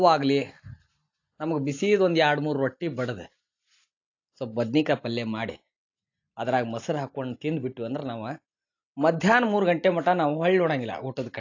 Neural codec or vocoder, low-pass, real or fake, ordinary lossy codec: none; 7.2 kHz; real; AAC, 48 kbps